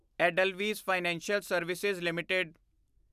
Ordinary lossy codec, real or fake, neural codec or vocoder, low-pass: none; real; none; 14.4 kHz